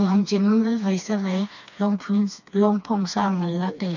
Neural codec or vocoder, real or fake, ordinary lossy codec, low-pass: codec, 16 kHz, 2 kbps, FreqCodec, smaller model; fake; none; 7.2 kHz